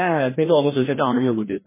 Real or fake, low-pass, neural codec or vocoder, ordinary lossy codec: fake; 3.6 kHz; codec, 16 kHz, 1 kbps, FreqCodec, larger model; MP3, 16 kbps